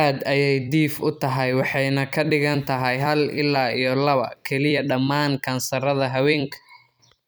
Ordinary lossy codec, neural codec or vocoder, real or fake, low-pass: none; vocoder, 44.1 kHz, 128 mel bands every 256 samples, BigVGAN v2; fake; none